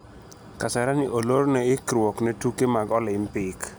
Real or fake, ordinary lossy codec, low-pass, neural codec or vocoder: real; none; none; none